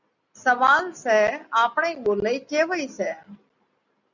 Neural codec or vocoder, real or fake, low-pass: none; real; 7.2 kHz